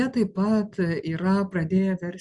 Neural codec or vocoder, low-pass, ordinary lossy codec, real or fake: none; 10.8 kHz; Opus, 64 kbps; real